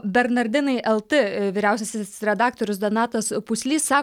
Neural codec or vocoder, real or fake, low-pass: none; real; 19.8 kHz